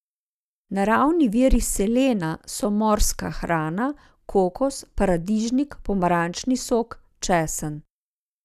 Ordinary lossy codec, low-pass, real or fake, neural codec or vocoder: none; 14.4 kHz; real; none